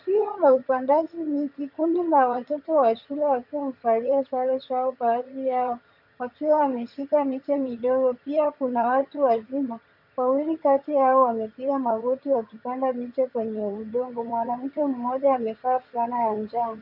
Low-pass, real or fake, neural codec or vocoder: 5.4 kHz; fake; vocoder, 22.05 kHz, 80 mel bands, HiFi-GAN